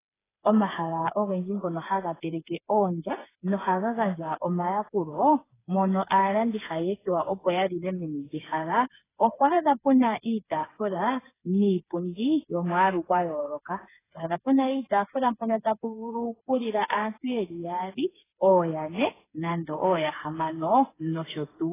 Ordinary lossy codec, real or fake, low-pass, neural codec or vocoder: AAC, 16 kbps; fake; 3.6 kHz; codec, 16 kHz, 4 kbps, FreqCodec, smaller model